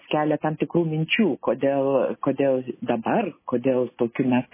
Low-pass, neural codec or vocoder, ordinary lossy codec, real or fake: 3.6 kHz; none; MP3, 16 kbps; real